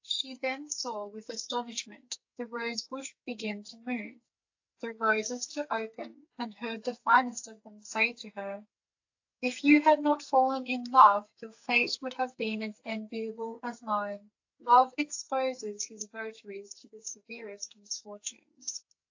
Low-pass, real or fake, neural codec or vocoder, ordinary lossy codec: 7.2 kHz; fake; codec, 44.1 kHz, 2.6 kbps, SNAC; AAC, 48 kbps